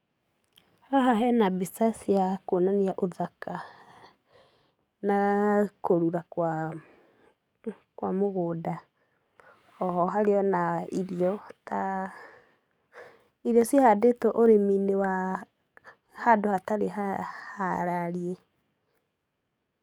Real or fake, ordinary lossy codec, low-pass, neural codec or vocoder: fake; none; 19.8 kHz; codec, 44.1 kHz, 7.8 kbps, DAC